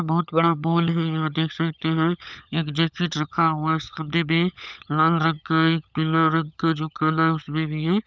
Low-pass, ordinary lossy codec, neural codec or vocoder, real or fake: none; none; codec, 16 kHz, 16 kbps, FunCodec, trained on LibriTTS, 50 frames a second; fake